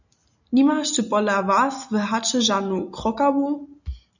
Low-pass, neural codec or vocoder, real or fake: 7.2 kHz; none; real